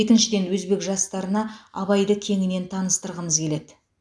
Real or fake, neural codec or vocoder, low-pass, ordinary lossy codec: real; none; none; none